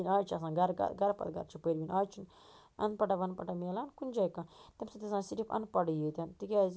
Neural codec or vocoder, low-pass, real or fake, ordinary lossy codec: none; none; real; none